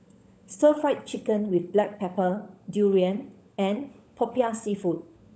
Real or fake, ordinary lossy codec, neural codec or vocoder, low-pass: fake; none; codec, 16 kHz, 16 kbps, FunCodec, trained on LibriTTS, 50 frames a second; none